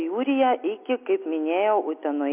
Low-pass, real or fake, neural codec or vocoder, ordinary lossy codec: 3.6 kHz; real; none; MP3, 32 kbps